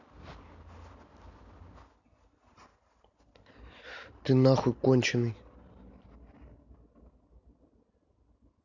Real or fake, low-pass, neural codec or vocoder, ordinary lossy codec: real; 7.2 kHz; none; none